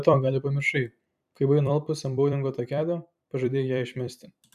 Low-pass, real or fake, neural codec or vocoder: 14.4 kHz; fake; vocoder, 44.1 kHz, 128 mel bands every 256 samples, BigVGAN v2